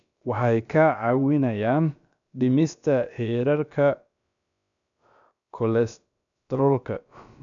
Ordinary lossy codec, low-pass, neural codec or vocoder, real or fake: none; 7.2 kHz; codec, 16 kHz, about 1 kbps, DyCAST, with the encoder's durations; fake